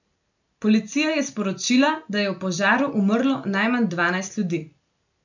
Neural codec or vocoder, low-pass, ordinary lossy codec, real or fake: none; 7.2 kHz; none; real